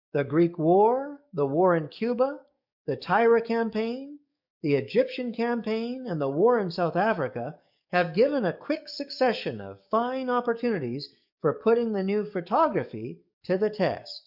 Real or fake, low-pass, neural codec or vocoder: fake; 5.4 kHz; codec, 44.1 kHz, 7.8 kbps, DAC